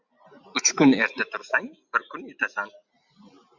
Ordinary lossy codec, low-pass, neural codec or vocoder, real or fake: MP3, 64 kbps; 7.2 kHz; none; real